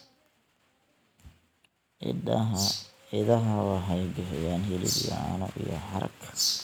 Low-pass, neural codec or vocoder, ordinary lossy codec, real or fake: none; none; none; real